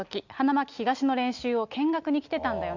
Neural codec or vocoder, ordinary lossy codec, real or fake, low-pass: none; none; real; 7.2 kHz